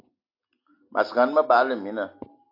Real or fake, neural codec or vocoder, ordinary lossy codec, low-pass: real; none; AAC, 32 kbps; 5.4 kHz